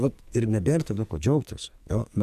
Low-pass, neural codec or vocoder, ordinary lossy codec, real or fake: 14.4 kHz; codec, 44.1 kHz, 2.6 kbps, SNAC; MP3, 96 kbps; fake